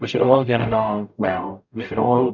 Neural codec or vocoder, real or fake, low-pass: codec, 44.1 kHz, 0.9 kbps, DAC; fake; 7.2 kHz